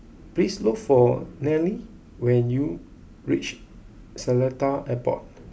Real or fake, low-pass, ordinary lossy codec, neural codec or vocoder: real; none; none; none